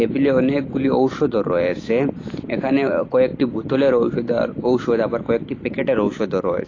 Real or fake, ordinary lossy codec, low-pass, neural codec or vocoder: real; AAC, 32 kbps; 7.2 kHz; none